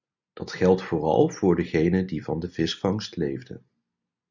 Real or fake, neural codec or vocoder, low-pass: real; none; 7.2 kHz